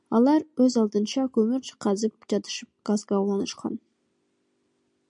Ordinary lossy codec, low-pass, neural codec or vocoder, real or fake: MP3, 64 kbps; 9.9 kHz; none; real